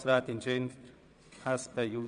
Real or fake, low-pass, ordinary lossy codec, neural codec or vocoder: fake; 9.9 kHz; none; vocoder, 22.05 kHz, 80 mel bands, Vocos